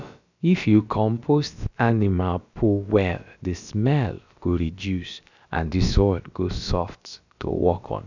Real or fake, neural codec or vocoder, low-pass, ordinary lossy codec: fake; codec, 16 kHz, about 1 kbps, DyCAST, with the encoder's durations; 7.2 kHz; none